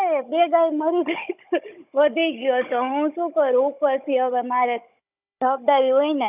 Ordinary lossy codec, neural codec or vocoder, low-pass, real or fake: none; codec, 16 kHz, 16 kbps, FunCodec, trained on Chinese and English, 50 frames a second; 3.6 kHz; fake